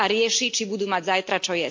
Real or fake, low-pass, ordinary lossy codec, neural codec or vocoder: real; 7.2 kHz; MP3, 48 kbps; none